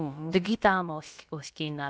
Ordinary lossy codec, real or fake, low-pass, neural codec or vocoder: none; fake; none; codec, 16 kHz, about 1 kbps, DyCAST, with the encoder's durations